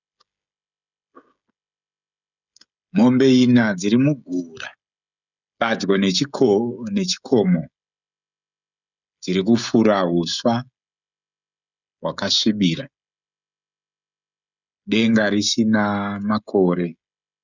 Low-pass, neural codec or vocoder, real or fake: 7.2 kHz; codec, 16 kHz, 16 kbps, FreqCodec, smaller model; fake